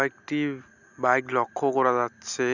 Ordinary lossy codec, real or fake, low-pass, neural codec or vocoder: none; real; 7.2 kHz; none